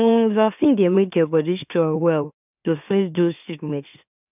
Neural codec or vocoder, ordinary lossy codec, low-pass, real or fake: autoencoder, 44.1 kHz, a latent of 192 numbers a frame, MeloTTS; none; 3.6 kHz; fake